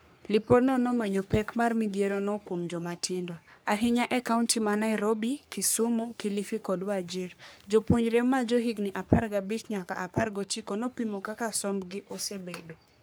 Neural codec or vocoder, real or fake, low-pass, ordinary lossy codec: codec, 44.1 kHz, 3.4 kbps, Pupu-Codec; fake; none; none